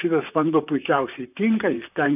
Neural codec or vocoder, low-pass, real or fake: vocoder, 44.1 kHz, 128 mel bands, Pupu-Vocoder; 3.6 kHz; fake